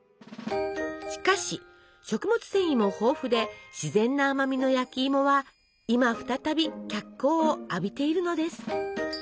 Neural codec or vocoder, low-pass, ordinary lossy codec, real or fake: none; none; none; real